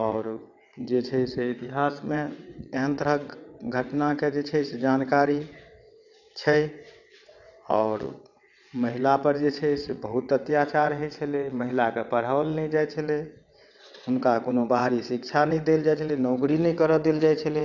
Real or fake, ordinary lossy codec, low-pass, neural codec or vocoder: fake; none; 7.2 kHz; vocoder, 22.05 kHz, 80 mel bands, WaveNeXt